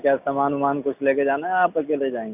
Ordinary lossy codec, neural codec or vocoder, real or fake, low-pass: none; none; real; 3.6 kHz